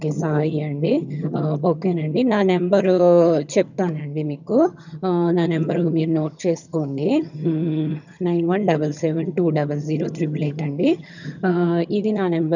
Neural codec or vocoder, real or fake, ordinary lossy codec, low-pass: vocoder, 22.05 kHz, 80 mel bands, HiFi-GAN; fake; none; 7.2 kHz